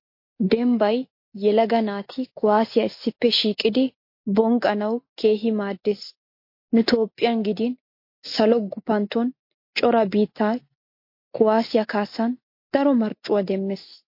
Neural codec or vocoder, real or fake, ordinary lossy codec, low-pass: none; real; MP3, 32 kbps; 5.4 kHz